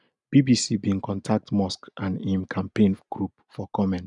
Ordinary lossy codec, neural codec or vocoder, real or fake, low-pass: none; none; real; 10.8 kHz